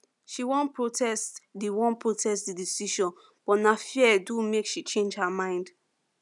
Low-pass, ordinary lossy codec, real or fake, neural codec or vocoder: 10.8 kHz; none; real; none